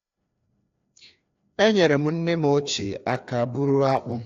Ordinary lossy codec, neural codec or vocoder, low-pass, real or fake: AAC, 48 kbps; codec, 16 kHz, 2 kbps, FreqCodec, larger model; 7.2 kHz; fake